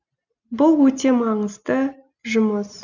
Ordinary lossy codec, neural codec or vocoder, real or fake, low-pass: none; none; real; 7.2 kHz